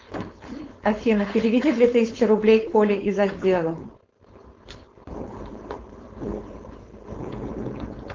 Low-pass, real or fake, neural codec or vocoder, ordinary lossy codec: 7.2 kHz; fake; codec, 16 kHz, 4.8 kbps, FACodec; Opus, 32 kbps